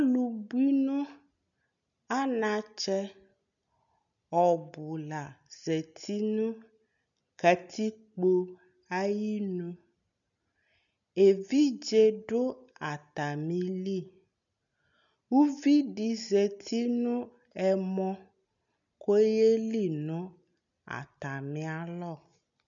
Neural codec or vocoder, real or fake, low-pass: none; real; 7.2 kHz